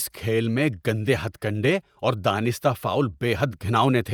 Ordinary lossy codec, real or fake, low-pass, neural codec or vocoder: none; fake; none; vocoder, 48 kHz, 128 mel bands, Vocos